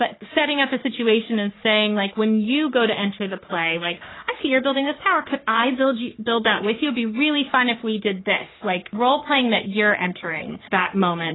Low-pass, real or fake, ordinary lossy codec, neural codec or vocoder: 7.2 kHz; fake; AAC, 16 kbps; codec, 44.1 kHz, 3.4 kbps, Pupu-Codec